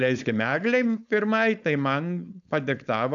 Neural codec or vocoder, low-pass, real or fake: codec, 16 kHz, 4.8 kbps, FACodec; 7.2 kHz; fake